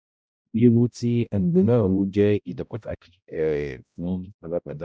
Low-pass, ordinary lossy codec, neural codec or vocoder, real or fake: none; none; codec, 16 kHz, 0.5 kbps, X-Codec, HuBERT features, trained on balanced general audio; fake